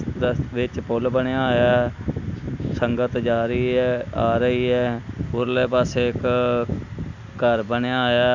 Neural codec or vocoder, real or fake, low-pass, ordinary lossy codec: none; real; 7.2 kHz; none